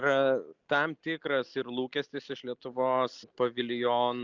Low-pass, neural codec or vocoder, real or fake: 7.2 kHz; none; real